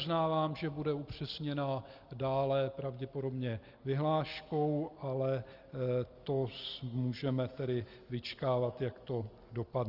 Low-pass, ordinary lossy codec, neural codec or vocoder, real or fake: 5.4 kHz; Opus, 24 kbps; none; real